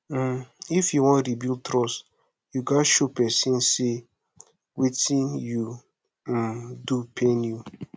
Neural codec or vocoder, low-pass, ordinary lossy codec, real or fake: none; none; none; real